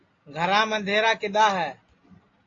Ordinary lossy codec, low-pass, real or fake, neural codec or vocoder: AAC, 32 kbps; 7.2 kHz; real; none